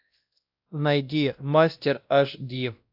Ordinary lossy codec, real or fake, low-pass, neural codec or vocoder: AAC, 48 kbps; fake; 5.4 kHz; codec, 16 kHz, 1 kbps, X-Codec, WavLM features, trained on Multilingual LibriSpeech